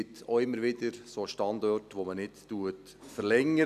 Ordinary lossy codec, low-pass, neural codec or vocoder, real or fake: none; 14.4 kHz; none; real